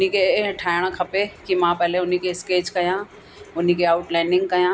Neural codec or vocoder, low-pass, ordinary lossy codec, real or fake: none; none; none; real